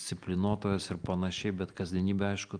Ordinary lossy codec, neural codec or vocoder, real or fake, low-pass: Opus, 32 kbps; none; real; 9.9 kHz